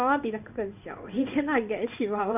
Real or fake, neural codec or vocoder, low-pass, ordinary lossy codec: fake; codec, 16 kHz in and 24 kHz out, 1 kbps, XY-Tokenizer; 3.6 kHz; none